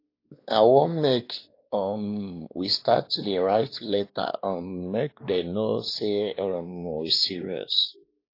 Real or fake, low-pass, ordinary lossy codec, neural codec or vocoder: fake; 5.4 kHz; AAC, 32 kbps; codec, 16 kHz, 2 kbps, X-Codec, WavLM features, trained on Multilingual LibriSpeech